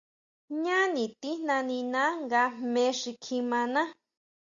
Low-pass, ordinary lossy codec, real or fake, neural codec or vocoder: 7.2 kHz; Opus, 64 kbps; real; none